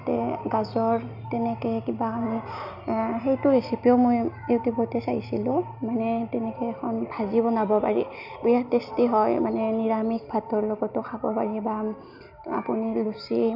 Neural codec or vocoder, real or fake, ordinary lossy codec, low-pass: none; real; none; 5.4 kHz